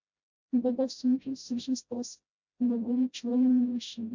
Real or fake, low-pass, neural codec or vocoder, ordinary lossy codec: fake; 7.2 kHz; codec, 16 kHz, 0.5 kbps, FreqCodec, smaller model; MP3, 64 kbps